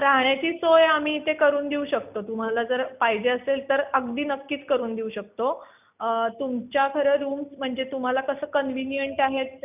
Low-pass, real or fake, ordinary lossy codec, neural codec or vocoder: 3.6 kHz; real; none; none